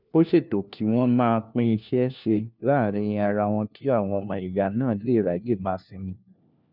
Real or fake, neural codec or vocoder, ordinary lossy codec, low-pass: fake; codec, 16 kHz, 1 kbps, FunCodec, trained on LibriTTS, 50 frames a second; none; 5.4 kHz